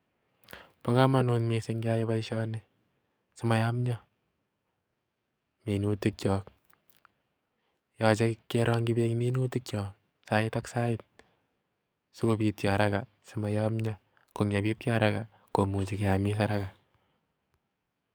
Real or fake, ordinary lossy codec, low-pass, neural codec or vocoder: fake; none; none; codec, 44.1 kHz, 7.8 kbps, DAC